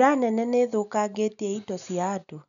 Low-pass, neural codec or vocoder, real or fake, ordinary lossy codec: 7.2 kHz; none; real; none